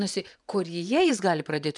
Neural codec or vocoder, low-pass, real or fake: vocoder, 24 kHz, 100 mel bands, Vocos; 10.8 kHz; fake